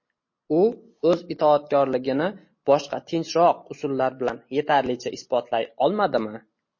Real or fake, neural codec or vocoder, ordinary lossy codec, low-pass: real; none; MP3, 32 kbps; 7.2 kHz